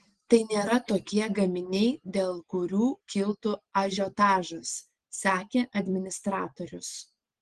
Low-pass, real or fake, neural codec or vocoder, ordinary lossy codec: 9.9 kHz; real; none; Opus, 16 kbps